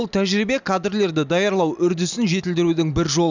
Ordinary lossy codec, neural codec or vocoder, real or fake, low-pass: none; none; real; 7.2 kHz